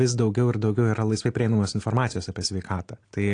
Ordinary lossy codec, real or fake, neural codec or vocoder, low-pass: AAC, 48 kbps; real; none; 9.9 kHz